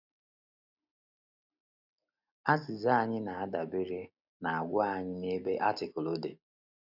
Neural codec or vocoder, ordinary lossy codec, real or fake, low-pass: none; none; real; 5.4 kHz